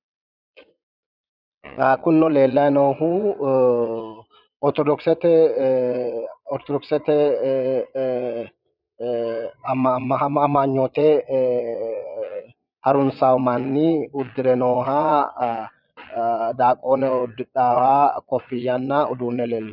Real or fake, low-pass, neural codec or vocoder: fake; 5.4 kHz; vocoder, 22.05 kHz, 80 mel bands, Vocos